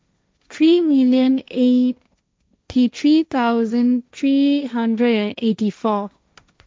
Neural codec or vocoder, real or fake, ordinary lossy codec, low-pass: codec, 16 kHz, 1.1 kbps, Voila-Tokenizer; fake; none; none